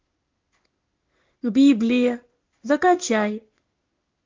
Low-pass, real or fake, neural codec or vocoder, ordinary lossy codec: 7.2 kHz; fake; codec, 16 kHz in and 24 kHz out, 1 kbps, XY-Tokenizer; Opus, 32 kbps